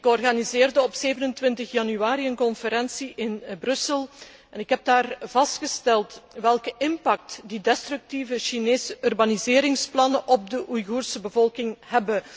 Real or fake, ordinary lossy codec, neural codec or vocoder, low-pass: real; none; none; none